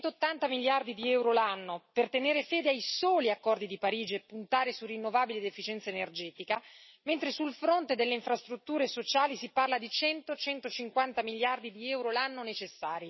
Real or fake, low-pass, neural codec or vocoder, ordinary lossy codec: real; 7.2 kHz; none; MP3, 24 kbps